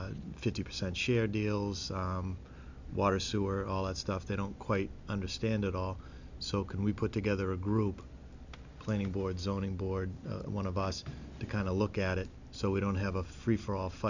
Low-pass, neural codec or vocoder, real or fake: 7.2 kHz; none; real